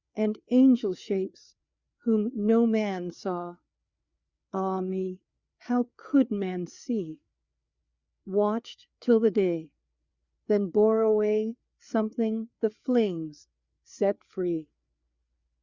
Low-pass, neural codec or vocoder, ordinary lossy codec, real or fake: 7.2 kHz; codec, 16 kHz, 4 kbps, FreqCodec, larger model; Opus, 64 kbps; fake